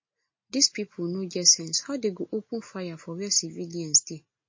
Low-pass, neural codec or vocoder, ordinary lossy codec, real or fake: 7.2 kHz; none; MP3, 32 kbps; real